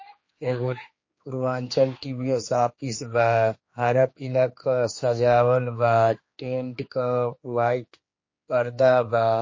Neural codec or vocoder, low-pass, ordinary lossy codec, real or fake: codec, 16 kHz, 2 kbps, X-Codec, HuBERT features, trained on general audio; 7.2 kHz; MP3, 32 kbps; fake